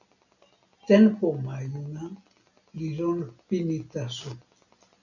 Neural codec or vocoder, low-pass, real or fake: none; 7.2 kHz; real